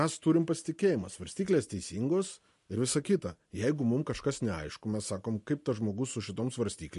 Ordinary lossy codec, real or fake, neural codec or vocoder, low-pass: MP3, 48 kbps; real; none; 14.4 kHz